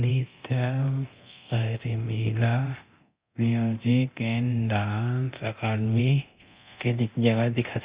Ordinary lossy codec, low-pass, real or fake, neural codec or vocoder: Opus, 64 kbps; 3.6 kHz; fake; codec, 24 kHz, 0.9 kbps, DualCodec